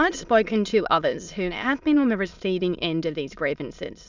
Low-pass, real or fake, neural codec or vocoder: 7.2 kHz; fake; autoencoder, 22.05 kHz, a latent of 192 numbers a frame, VITS, trained on many speakers